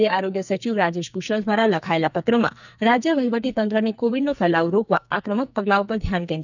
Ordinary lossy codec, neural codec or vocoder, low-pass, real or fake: none; codec, 44.1 kHz, 2.6 kbps, SNAC; 7.2 kHz; fake